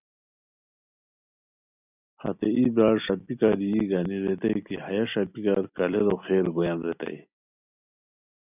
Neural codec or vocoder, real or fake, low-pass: none; real; 3.6 kHz